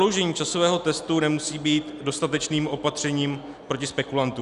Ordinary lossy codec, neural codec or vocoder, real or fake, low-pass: Opus, 64 kbps; none; real; 10.8 kHz